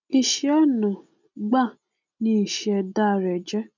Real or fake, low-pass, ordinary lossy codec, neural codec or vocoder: real; 7.2 kHz; none; none